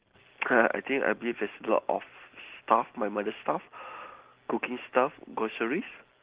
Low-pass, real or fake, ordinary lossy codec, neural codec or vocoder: 3.6 kHz; real; Opus, 16 kbps; none